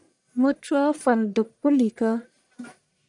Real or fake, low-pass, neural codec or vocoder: fake; 10.8 kHz; codec, 44.1 kHz, 1.7 kbps, Pupu-Codec